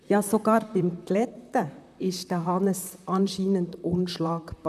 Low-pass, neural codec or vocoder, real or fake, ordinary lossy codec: 14.4 kHz; vocoder, 44.1 kHz, 128 mel bands, Pupu-Vocoder; fake; none